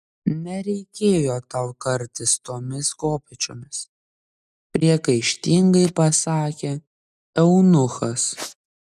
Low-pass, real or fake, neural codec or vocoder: 14.4 kHz; real; none